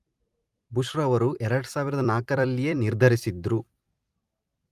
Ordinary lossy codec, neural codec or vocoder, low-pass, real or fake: Opus, 32 kbps; vocoder, 44.1 kHz, 128 mel bands every 256 samples, BigVGAN v2; 14.4 kHz; fake